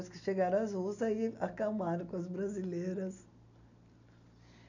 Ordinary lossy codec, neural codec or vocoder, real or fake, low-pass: none; none; real; 7.2 kHz